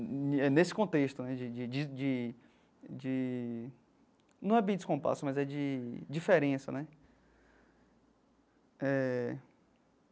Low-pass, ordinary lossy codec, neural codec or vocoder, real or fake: none; none; none; real